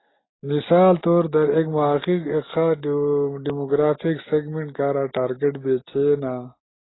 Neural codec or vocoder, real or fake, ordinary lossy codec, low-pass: none; real; AAC, 16 kbps; 7.2 kHz